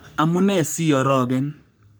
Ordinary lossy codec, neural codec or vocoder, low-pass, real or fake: none; codec, 44.1 kHz, 3.4 kbps, Pupu-Codec; none; fake